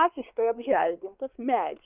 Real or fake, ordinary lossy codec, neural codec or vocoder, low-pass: fake; Opus, 24 kbps; codec, 16 kHz, 2 kbps, X-Codec, WavLM features, trained on Multilingual LibriSpeech; 3.6 kHz